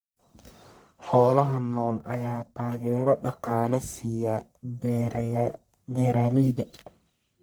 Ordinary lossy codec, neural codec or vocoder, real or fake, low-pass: none; codec, 44.1 kHz, 1.7 kbps, Pupu-Codec; fake; none